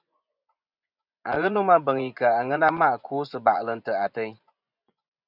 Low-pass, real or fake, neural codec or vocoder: 5.4 kHz; fake; vocoder, 24 kHz, 100 mel bands, Vocos